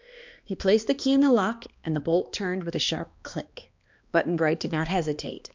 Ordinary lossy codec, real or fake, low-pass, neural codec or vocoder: MP3, 64 kbps; fake; 7.2 kHz; codec, 16 kHz, 2 kbps, X-Codec, HuBERT features, trained on balanced general audio